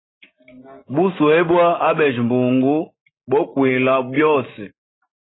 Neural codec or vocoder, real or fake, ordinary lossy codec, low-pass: none; real; AAC, 16 kbps; 7.2 kHz